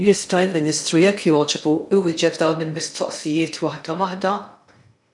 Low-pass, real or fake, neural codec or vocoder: 10.8 kHz; fake; codec, 16 kHz in and 24 kHz out, 0.6 kbps, FocalCodec, streaming, 2048 codes